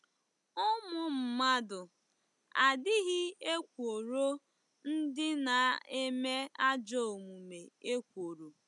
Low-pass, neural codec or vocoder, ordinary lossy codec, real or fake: 10.8 kHz; none; none; real